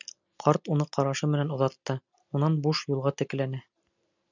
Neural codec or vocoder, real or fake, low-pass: none; real; 7.2 kHz